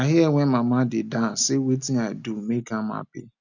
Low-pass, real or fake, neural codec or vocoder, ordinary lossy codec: 7.2 kHz; real; none; none